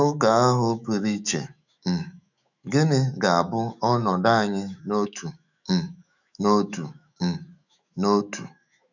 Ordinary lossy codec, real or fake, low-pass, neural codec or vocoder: none; fake; 7.2 kHz; autoencoder, 48 kHz, 128 numbers a frame, DAC-VAE, trained on Japanese speech